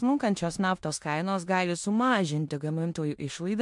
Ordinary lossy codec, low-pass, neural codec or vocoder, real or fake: MP3, 64 kbps; 10.8 kHz; codec, 16 kHz in and 24 kHz out, 0.9 kbps, LongCat-Audio-Codec, four codebook decoder; fake